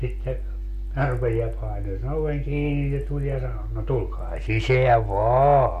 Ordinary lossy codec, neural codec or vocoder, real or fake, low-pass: MP3, 64 kbps; none; real; 14.4 kHz